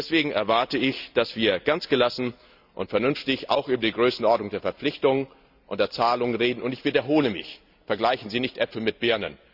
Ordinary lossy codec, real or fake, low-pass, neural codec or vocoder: none; real; 5.4 kHz; none